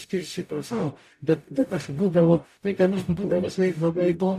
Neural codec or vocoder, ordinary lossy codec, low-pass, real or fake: codec, 44.1 kHz, 0.9 kbps, DAC; MP3, 96 kbps; 14.4 kHz; fake